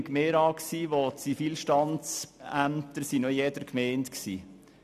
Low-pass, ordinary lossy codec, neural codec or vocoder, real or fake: 14.4 kHz; none; none; real